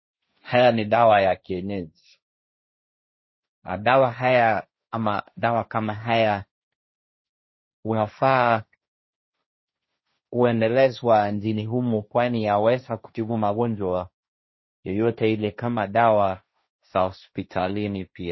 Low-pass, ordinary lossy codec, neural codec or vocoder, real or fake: 7.2 kHz; MP3, 24 kbps; codec, 16 kHz, 1.1 kbps, Voila-Tokenizer; fake